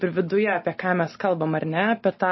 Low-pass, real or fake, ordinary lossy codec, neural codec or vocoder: 7.2 kHz; real; MP3, 24 kbps; none